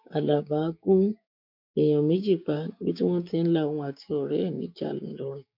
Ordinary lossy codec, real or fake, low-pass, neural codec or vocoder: MP3, 48 kbps; fake; 5.4 kHz; codec, 16 kHz, 6 kbps, DAC